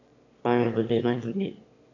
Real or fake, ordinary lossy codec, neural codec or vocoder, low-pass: fake; AAC, 48 kbps; autoencoder, 22.05 kHz, a latent of 192 numbers a frame, VITS, trained on one speaker; 7.2 kHz